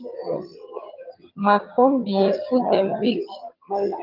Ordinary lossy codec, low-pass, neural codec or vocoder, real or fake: Opus, 24 kbps; 5.4 kHz; vocoder, 22.05 kHz, 80 mel bands, HiFi-GAN; fake